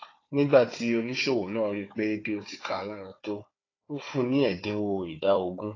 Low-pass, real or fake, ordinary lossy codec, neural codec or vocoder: 7.2 kHz; fake; AAC, 32 kbps; codec, 16 kHz, 4 kbps, FunCodec, trained on Chinese and English, 50 frames a second